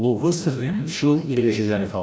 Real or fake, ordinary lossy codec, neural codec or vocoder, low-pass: fake; none; codec, 16 kHz, 0.5 kbps, FreqCodec, larger model; none